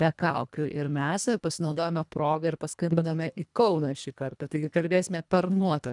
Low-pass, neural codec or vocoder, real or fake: 10.8 kHz; codec, 24 kHz, 1.5 kbps, HILCodec; fake